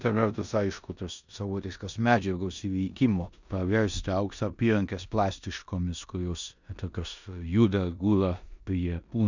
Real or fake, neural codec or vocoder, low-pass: fake; codec, 16 kHz in and 24 kHz out, 0.9 kbps, LongCat-Audio-Codec, four codebook decoder; 7.2 kHz